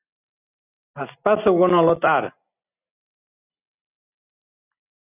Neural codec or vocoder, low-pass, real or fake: none; 3.6 kHz; real